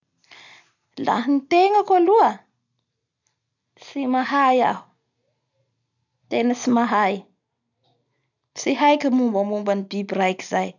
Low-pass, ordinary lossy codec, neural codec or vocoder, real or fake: 7.2 kHz; none; none; real